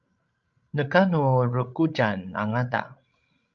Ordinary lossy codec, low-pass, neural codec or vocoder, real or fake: Opus, 24 kbps; 7.2 kHz; codec, 16 kHz, 16 kbps, FreqCodec, larger model; fake